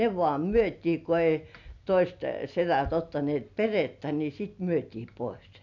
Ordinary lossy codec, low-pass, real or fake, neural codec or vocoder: none; 7.2 kHz; real; none